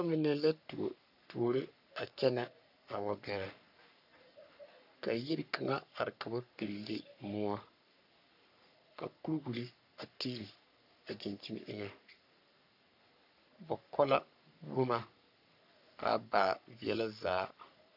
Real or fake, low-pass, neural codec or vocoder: fake; 5.4 kHz; codec, 44.1 kHz, 3.4 kbps, Pupu-Codec